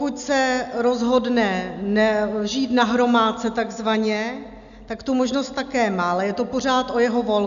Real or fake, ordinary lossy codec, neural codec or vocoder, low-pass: real; MP3, 96 kbps; none; 7.2 kHz